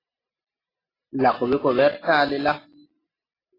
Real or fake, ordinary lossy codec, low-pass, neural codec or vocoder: real; AAC, 24 kbps; 5.4 kHz; none